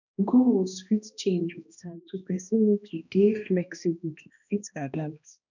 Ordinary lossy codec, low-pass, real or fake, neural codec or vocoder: none; 7.2 kHz; fake; codec, 16 kHz, 1 kbps, X-Codec, HuBERT features, trained on balanced general audio